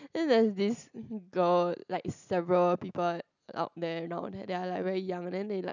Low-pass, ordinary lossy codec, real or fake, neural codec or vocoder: 7.2 kHz; none; real; none